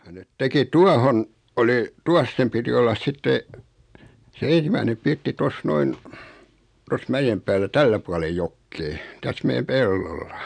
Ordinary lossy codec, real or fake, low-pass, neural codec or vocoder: none; real; 9.9 kHz; none